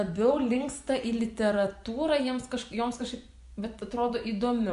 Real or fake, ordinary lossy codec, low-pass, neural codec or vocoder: fake; MP3, 96 kbps; 10.8 kHz; vocoder, 24 kHz, 100 mel bands, Vocos